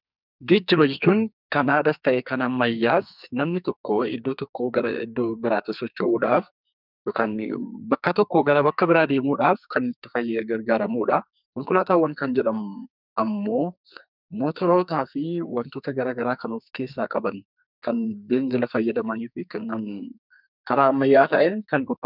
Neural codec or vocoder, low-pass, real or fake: codec, 44.1 kHz, 2.6 kbps, SNAC; 5.4 kHz; fake